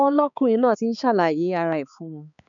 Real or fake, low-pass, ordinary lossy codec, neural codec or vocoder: fake; 7.2 kHz; none; codec, 16 kHz, 4 kbps, X-Codec, HuBERT features, trained on balanced general audio